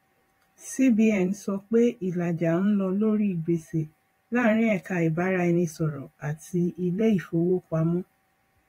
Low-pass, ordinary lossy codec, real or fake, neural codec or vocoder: 19.8 kHz; AAC, 48 kbps; fake; vocoder, 44.1 kHz, 128 mel bands every 512 samples, BigVGAN v2